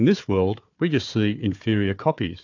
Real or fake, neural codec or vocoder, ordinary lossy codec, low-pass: fake; codec, 16 kHz, 4 kbps, FunCodec, trained on Chinese and English, 50 frames a second; AAC, 48 kbps; 7.2 kHz